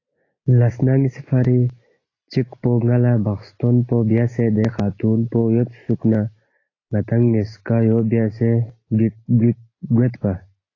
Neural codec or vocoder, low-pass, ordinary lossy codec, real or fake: none; 7.2 kHz; AAC, 32 kbps; real